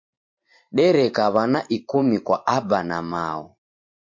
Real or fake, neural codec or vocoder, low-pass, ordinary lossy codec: fake; vocoder, 44.1 kHz, 128 mel bands every 512 samples, BigVGAN v2; 7.2 kHz; MP3, 48 kbps